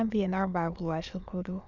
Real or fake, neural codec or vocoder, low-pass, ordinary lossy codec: fake; autoencoder, 22.05 kHz, a latent of 192 numbers a frame, VITS, trained on many speakers; 7.2 kHz; none